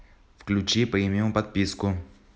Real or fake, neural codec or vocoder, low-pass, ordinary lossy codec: real; none; none; none